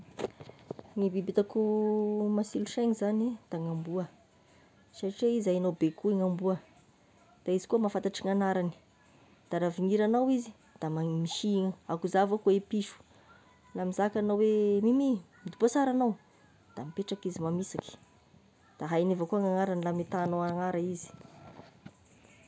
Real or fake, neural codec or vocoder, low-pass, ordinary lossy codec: real; none; none; none